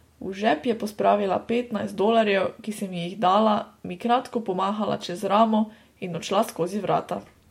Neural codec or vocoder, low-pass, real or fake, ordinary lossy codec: none; 19.8 kHz; real; MP3, 64 kbps